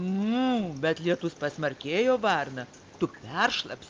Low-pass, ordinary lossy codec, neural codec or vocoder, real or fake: 7.2 kHz; Opus, 24 kbps; none; real